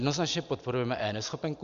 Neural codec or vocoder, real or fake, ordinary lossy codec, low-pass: none; real; MP3, 64 kbps; 7.2 kHz